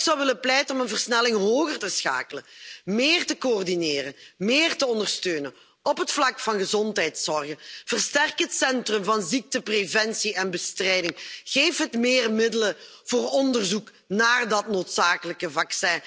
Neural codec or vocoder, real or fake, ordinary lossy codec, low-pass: none; real; none; none